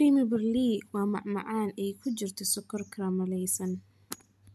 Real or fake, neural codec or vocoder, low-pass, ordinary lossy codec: real; none; 14.4 kHz; MP3, 96 kbps